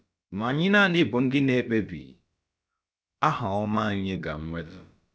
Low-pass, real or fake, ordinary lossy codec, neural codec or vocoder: none; fake; none; codec, 16 kHz, about 1 kbps, DyCAST, with the encoder's durations